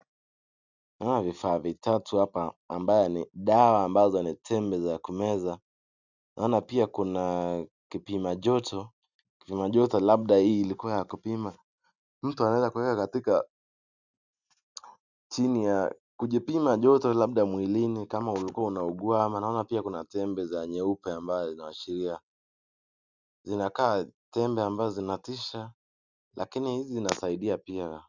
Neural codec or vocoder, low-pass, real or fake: none; 7.2 kHz; real